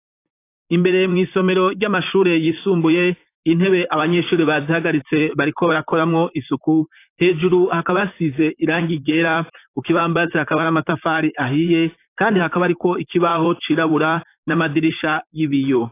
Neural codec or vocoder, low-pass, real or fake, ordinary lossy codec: vocoder, 44.1 kHz, 128 mel bands, Pupu-Vocoder; 3.6 kHz; fake; AAC, 24 kbps